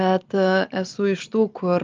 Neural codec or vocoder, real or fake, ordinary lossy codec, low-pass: none; real; Opus, 24 kbps; 7.2 kHz